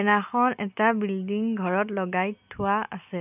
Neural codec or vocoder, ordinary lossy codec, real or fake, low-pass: none; none; real; 3.6 kHz